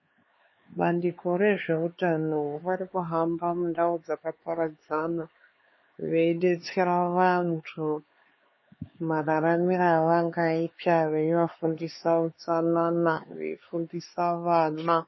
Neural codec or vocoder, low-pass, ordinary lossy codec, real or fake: codec, 16 kHz, 4 kbps, X-Codec, HuBERT features, trained on LibriSpeech; 7.2 kHz; MP3, 24 kbps; fake